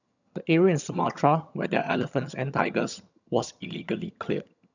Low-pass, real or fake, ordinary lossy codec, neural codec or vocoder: 7.2 kHz; fake; none; vocoder, 22.05 kHz, 80 mel bands, HiFi-GAN